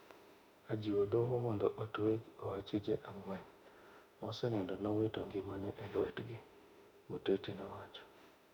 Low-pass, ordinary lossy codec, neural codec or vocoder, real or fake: 19.8 kHz; none; autoencoder, 48 kHz, 32 numbers a frame, DAC-VAE, trained on Japanese speech; fake